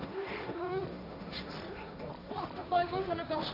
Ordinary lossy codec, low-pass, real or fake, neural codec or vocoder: none; 5.4 kHz; fake; codec, 16 kHz, 1.1 kbps, Voila-Tokenizer